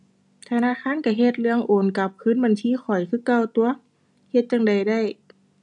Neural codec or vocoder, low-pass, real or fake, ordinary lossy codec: none; 10.8 kHz; real; none